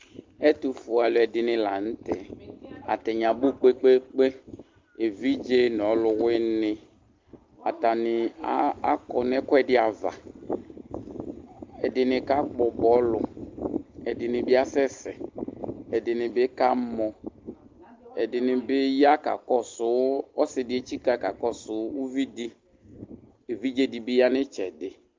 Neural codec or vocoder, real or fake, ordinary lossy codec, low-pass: none; real; Opus, 32 kbps; 7.2 kHz